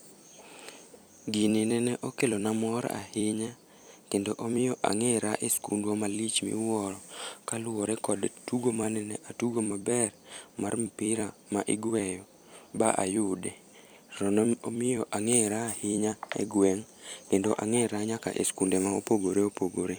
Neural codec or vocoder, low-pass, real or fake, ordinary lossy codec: vocoder, 44.1 kHz, 128 mel bands every 256 samples, BigVGAN v2; none; fake; none